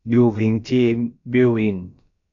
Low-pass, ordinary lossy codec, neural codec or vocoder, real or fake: 7.2 kHz; AAC, 64 kbps; codec, 16 kHz, about 1 kbps, DyCAST, with the encoder's durations; fake